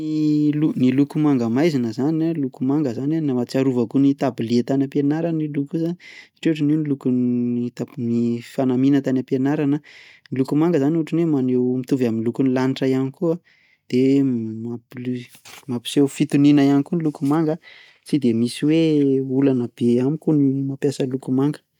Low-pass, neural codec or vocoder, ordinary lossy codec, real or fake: 19.8 kHz; none; none; real